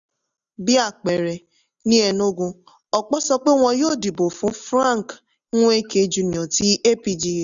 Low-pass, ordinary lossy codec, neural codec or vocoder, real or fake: 7.2 kHz; none; none; real